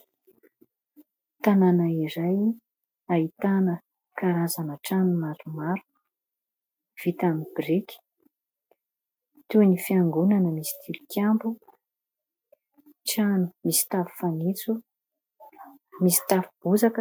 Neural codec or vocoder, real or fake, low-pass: none; real; 19.8 kHz